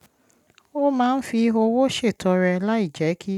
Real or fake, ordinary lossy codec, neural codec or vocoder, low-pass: real; none; none; 19.8 kHz